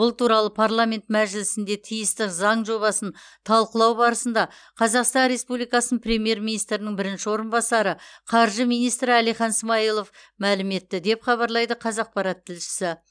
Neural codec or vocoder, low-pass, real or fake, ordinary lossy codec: none; 9.9 kHz; real; none